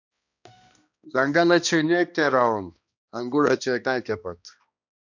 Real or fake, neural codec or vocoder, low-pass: fake; codec, 16 kHz, 1 kbps, X-Codec, HuBERT features, trained on balanced general audio; 7.2 kHz